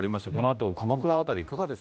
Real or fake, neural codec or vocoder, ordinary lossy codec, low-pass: fake; codec, 16 kHz, 1 kbps, X-Codec, HuBERT features, trained on general audio; none; none